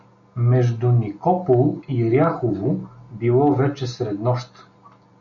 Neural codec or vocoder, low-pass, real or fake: none; 7.2 kHz; real